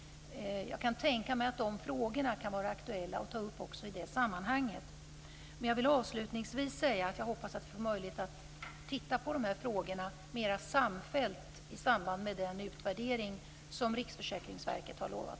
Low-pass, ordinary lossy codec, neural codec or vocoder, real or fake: none; none; none; real